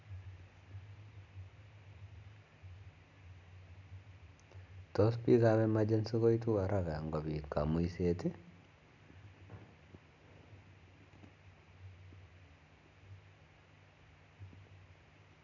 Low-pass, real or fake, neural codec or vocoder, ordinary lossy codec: 7.2 kHz; real; none; none